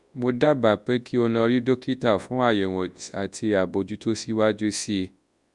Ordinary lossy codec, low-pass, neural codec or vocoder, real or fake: Opus, 64 kbps; 10.8 kHz; codec, 24 kHz, 0.9 kbps, WavTokenizer, large speech release; fake